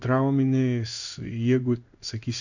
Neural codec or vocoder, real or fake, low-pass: codec, 16 kHz in and 24 kHz out, 1 kbps, XY-Tokenizer; fake; 7.2 kHz